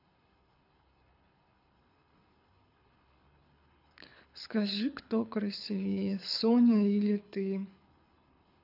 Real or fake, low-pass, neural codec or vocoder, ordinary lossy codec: fake; 5.4 kHz; codec, 24 kHz, 6 kbps, HILCodec; none